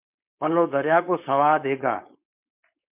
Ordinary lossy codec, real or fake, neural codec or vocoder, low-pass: MP3, 32 kbps; fake; codec, 16 kHz, 4.8 kbps, FACodec; 3.6 kHz